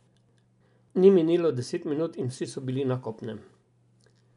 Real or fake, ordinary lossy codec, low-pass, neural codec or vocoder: real; MP3, 96 kbps; 10.8 kHz; none